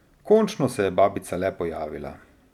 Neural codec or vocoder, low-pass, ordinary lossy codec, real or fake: none; 19.8 kHz; none; real